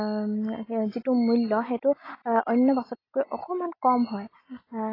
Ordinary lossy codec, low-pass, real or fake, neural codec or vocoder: AAC, 24 kbps; 5.4 kHz; real; none